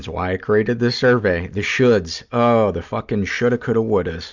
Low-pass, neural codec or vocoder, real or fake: 7.2 kHz; none; real